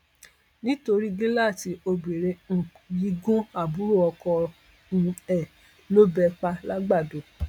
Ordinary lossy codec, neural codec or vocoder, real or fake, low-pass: none; none; real; 19.8 kHz